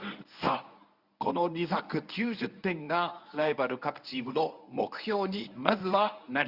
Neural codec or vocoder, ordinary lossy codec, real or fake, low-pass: codec, 24 kHz, 0.9 kbps, WavTokenizer, medium speech release version 1; Opus, 64 kbps; fake; 5.4 kHz